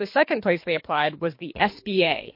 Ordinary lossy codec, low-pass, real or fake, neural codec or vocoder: MP3, 32 kbps; 5.4 kHz; fake; codec, 24 kHz, 3 kbps, HILCodec